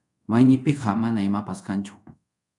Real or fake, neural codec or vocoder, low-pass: fake; codec, 24 kHz, 0.5 kbps, DualCodec; 10.8 kHz